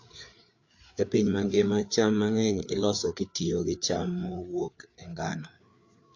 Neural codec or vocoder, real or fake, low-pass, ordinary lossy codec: codec, 16 kHz, 4 kbps, FreqCodec, larger model; fake; 7.2 kHz; AAC, 48 kbps